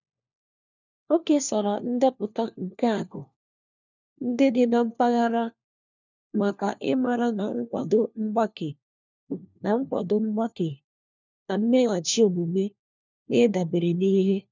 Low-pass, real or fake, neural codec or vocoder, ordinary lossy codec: 7.2 kHz; fake; codec, 16 kHz, 1 kbps, FunCodec, trained on LibriTTS, 50 frames a second; none